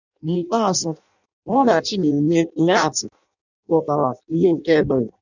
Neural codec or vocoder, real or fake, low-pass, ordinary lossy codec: codec, 16 kHz in and 24 kHz out, 0.6 kbps, FireRedTTS-2 codec; fake; 7.2 kHz; none